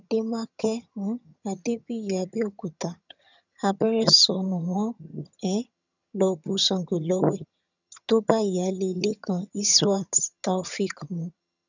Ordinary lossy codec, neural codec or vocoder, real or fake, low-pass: none; vocoder, 22.05 kHz, 80 mel bands, HiFi-GAN; fake; 7.2 kHz